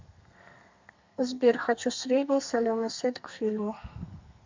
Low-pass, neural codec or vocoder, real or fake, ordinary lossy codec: 7.2 kHz; codec, 32 kHz, 1.9 kbps, SNAC; fake; MP3, 64 kbps